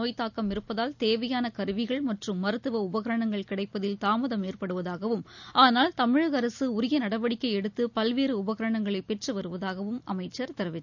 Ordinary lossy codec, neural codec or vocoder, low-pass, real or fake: none; none; 7.2 kHz; real